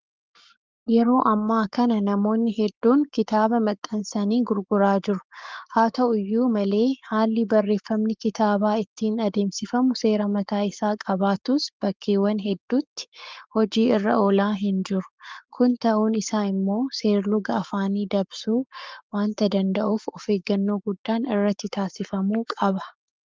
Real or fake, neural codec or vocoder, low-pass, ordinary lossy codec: fake; codec, 44.1 kHz, 7.8 kbps, Pupu-Codec; 7.2 kHz; Opus, 32 kbps